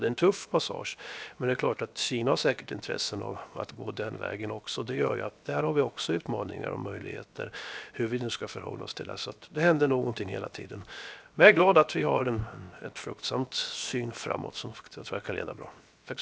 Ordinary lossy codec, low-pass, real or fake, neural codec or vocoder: none; none; fake; codec, 16 kHz, 0.7 kbps, FocalCodec